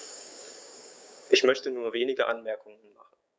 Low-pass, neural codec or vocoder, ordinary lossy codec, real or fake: none; codec, 16 kHz, 6 kbps, DAC; none; fake